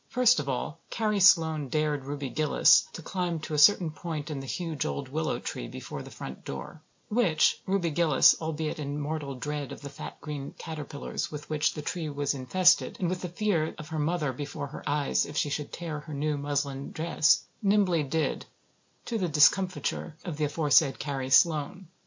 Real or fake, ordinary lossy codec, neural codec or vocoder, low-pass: real; MP3, 48 kbps; none; 7.2 kHz